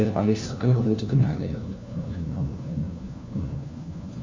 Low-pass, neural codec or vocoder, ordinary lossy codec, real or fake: 7.2 kHz; codec, 16 kHz, 1 kbps, FunCodec, trained on LibriTTS, 50 frames a second; MP3, 64 kbps; fake